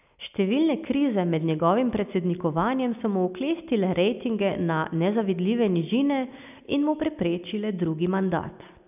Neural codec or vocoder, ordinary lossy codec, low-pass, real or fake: none; none; 3.6 kHz; real